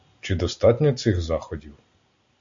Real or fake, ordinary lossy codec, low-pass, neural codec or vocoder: real; AAC, 64 kbps; 7.2 kHz; none